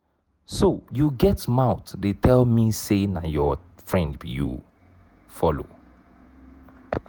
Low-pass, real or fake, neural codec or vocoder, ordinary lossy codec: none; fake; vocoder, 48 kHz, 128 mel bands, Vocos; none